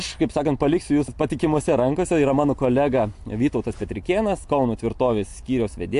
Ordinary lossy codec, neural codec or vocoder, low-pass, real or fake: AAC, 64 kbps; none; 10.8 kHz; real